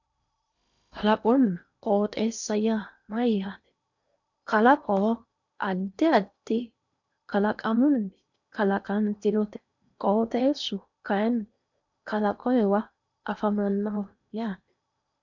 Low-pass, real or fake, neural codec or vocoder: 7.2 kHz; fake; codec, 16 kHz in and 24 kHz out, 0.8 kbps, FocalCodec, streaming, 65536 codes